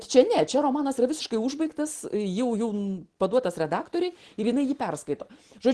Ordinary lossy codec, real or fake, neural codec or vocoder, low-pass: Opus, 16 kbps; real; none; 10.8 kHz